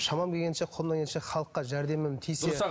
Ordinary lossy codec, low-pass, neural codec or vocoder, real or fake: none; none; none; real